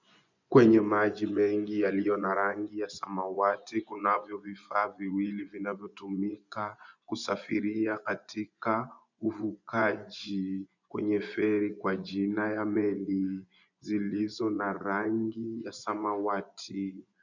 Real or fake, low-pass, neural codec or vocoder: fake; 7.2 kHz; vocoder, 24 kHz, 100 mel bands, Vocos